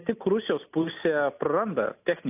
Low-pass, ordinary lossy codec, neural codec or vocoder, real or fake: 3.6 kHz; AAC, 32 kbps; none; real